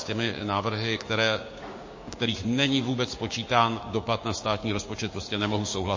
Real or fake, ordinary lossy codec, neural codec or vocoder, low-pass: fake; MP3, 32 kbps; codec, 16 kHz, 6 kbps, DAC; 7.2 kHz